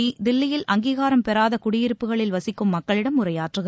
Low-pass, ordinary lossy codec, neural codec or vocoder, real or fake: none; none; none; real